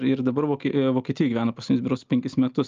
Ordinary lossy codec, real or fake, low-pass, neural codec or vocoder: Opus, 24 kbps; real; 7.2 kHz; none